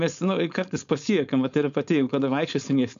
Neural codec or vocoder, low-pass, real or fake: codec, 16 kHz, 4.8 kbps, FACodec; 7.2 kHz; fake